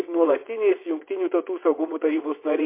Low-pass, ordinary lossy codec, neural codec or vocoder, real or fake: 3.6 kHz; MP3, 24 kbps; vocoder, 22.05 kHz, 80 mel bands, WaveNeXt; fake